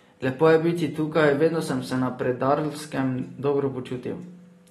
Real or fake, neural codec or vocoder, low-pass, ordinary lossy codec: real; none; 19.8 kHz; AAC, 32 kbps